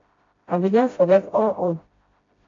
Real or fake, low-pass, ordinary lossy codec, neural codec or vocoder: fake; 7.2 kHz; AAC, 32 kbps; codec, 16 kHz, 0.5 kbps, FreqCodec, smaller model